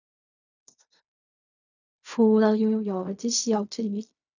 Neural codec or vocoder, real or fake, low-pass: codec, 16 kHz in and 24 kHz out, 0.4 kbps, LongCat-Audio-Codec, fine tuned four codebook decoder; fake; 7.2 kHz